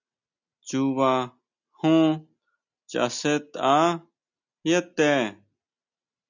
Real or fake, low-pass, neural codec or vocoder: real; 7.2 kHz; none